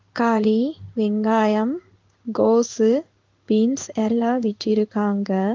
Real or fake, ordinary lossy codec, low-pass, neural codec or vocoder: fake; Opus, 24 kbps; 7.2 kHz; codec, 16 kHz in and 24 kHz out, 1 kbps, XY-Tokenizer